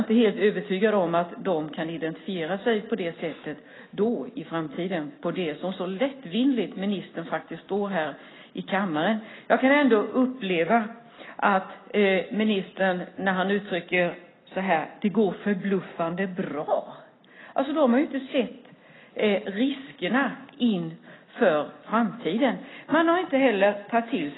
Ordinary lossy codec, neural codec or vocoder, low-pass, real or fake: AAC, 16 kbps; none; 7.2 kHz; real